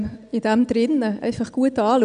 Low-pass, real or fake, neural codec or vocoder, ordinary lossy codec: 9.9 kHz; real; none; none